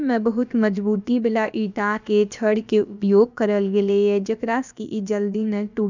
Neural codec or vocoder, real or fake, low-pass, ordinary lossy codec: codec, 16 kHz, about 1 kbps, DyCAST, with the encoder's durations; fake; 7.2 kHz; none